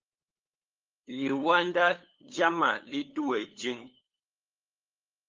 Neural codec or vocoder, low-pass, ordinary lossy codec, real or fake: codec, 16 kHz, 8 kbps, FunCodec, trained on LibriTTS, 25 frames a second; 7.2 kHz; Opus, 32 kbps; fake